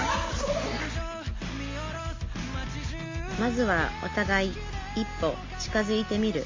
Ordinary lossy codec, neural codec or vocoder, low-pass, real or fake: MP3, 48 kbps; none; 7.2 kHz; real